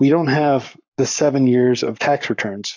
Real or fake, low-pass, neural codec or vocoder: fake; 7.2 kHz; codec, 44.1 kHz, 7.8 kbps, Pupu-Codec